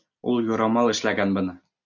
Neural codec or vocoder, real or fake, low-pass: none; real; 7.2 kHz